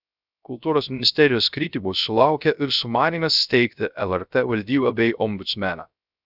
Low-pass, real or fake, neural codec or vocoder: 5.4 kHz; fake; codec, 16 kHz, 0.3 kbps, FocalCodec